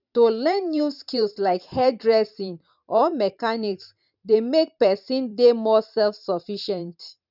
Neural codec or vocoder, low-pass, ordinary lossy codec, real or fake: vocoder, 44.1 kHz, 128 mel bands every 512 samples, BigVGAN v2; 5.4 kHz; none; fake